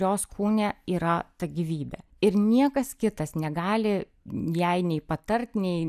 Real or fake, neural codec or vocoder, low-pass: real; none; 14.4 kHz